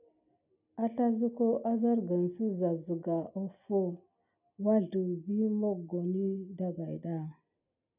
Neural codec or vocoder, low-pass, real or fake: none; 3.6 kHz; real